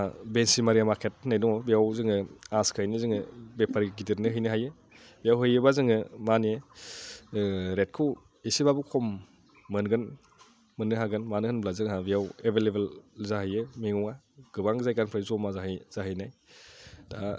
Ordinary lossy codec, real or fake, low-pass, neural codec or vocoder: none; real; none; none